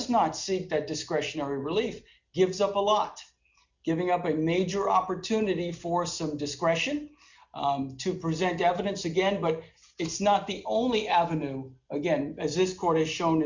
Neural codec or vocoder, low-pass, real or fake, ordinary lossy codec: none; 7.2 kHz; real; Opus, 64 kbps